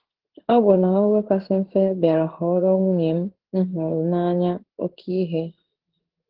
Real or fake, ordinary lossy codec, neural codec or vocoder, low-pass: fake; Opus, 16 kbps; codec, 16 kHz in and 24 kHz out, 1 kbps, XY-Tokenizer; 5.4 kHz